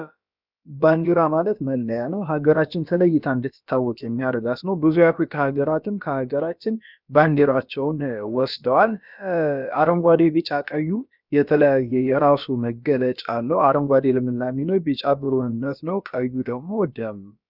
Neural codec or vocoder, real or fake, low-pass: codec, 16 kHz, about 1 kbps, DyCAST, with the encoder's durations; fake; 5.4 kHz